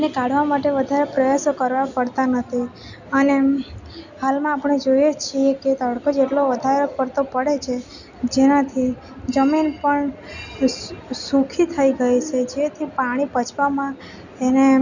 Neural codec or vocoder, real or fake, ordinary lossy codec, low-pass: none; real; none; 7.2 kHz